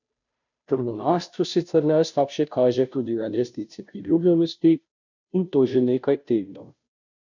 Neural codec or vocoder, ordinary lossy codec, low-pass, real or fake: codec, 16 kHz, 0.5 kbps, FunCodec, trained on Chinese and English, 25 frames a second; none; 7.2 kHz; fake